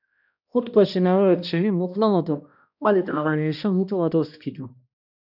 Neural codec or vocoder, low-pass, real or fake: codec, 16 kHz, 1 kbps, X-Codec, HuBERT features, trained on balanced general audio; 5.4 kHz; fake